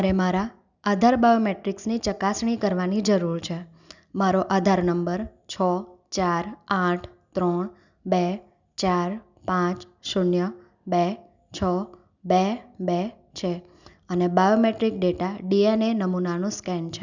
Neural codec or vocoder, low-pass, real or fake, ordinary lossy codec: none; 7.2 kHz; real; none